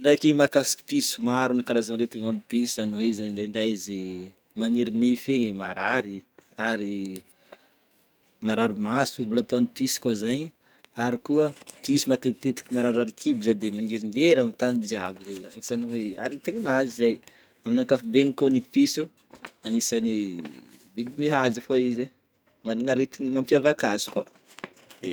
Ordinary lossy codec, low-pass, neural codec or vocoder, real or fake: none; none; codec, 44.1 kHz, 2.6 kbps, SNAC; fake